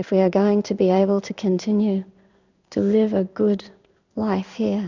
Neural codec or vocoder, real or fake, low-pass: codec, 16 kHz in and 24 kHz out, 1 kbps, XY-Tokenizer; fake; 7.2 kHz